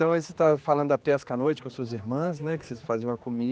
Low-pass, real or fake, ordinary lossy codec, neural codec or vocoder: none; fake; none; codec, 16 kHz, 2 kbps, X-Codec, HuBERT features, trained on general audio